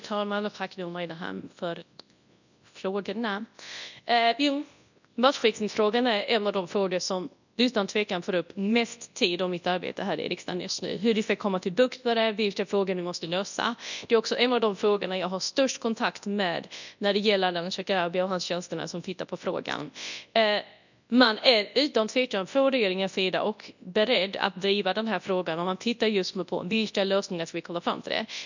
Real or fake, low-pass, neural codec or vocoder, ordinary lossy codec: fake; 7.2 kHz; codec, 24 kHz, 0.9 kbps, WavTokenizer, large speech release; none